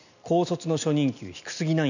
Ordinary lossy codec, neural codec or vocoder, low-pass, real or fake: none; none; 7.2 kHz; real